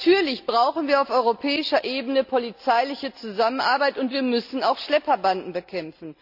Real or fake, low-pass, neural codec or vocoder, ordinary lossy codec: real; 5.4 kHz; none; none